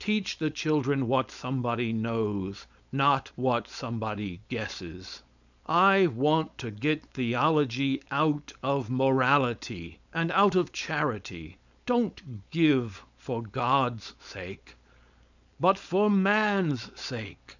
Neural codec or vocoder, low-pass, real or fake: codec, 16 kHz, 4.8 kbps, FACodec; 7.2 kHz; fake